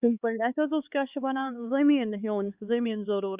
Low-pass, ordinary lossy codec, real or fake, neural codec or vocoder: 3.6 kHz; Opus, 64 kbps; fake; codec, 16 kHz, 4 kbps, X-Codec, HuBERT features, trained on LibriSpeech